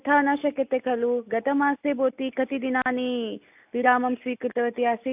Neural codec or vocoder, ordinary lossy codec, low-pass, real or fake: none; AAC, 32 kbps; 3.6 kHz; real